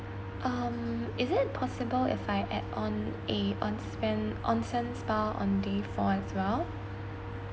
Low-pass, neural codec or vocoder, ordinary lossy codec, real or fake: none; none; none; real